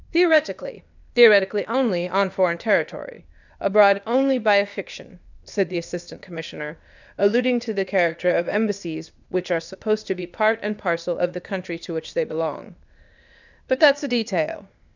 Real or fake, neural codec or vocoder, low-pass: fake; codec, 16 kHz, 0.8 kbps, ZipCodec; 7.2 kHz